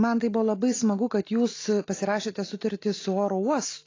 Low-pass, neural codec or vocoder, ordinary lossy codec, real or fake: 7.2 kHz; none; AAC, 32 kbps; real